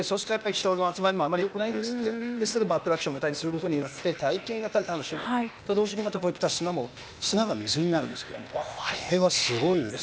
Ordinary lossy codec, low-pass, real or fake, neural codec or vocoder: none; none; fake; codec, 16 kHz, 0.8 kbps, ZipCodec